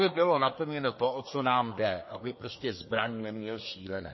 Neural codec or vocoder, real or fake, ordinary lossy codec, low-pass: codec, 24 kHz, 1 kbps, SNAC; fake; MP3, 24 kbps; 7.2 kHz